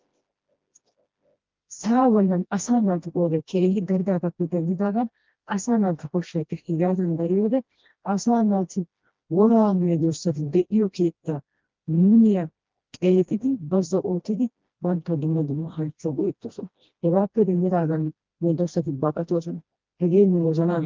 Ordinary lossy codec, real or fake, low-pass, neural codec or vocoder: Opus, 32 kbps; fake; 7.2 kHz; codec, 16 kHz, 1 kbps, FreqCodec, smaller model